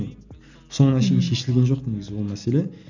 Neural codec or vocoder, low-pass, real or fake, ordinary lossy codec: none; 7.2 kHz; real; none